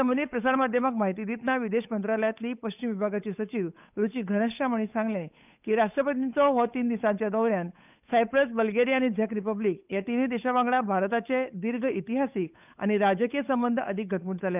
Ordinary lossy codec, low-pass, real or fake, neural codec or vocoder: none; 3.6 kHz; fake; codec, 16 kHz, 8 kbps, FunCodec, trained on Chinese and English, 25 frames a second